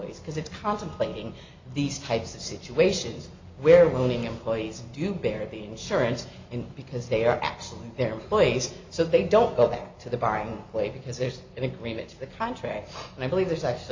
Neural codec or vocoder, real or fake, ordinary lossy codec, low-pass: none; real; MP3, 64 kbps; 7.2 kHz